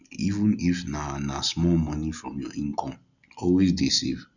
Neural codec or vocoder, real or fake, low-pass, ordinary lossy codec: none; real; 7.2 kHz; none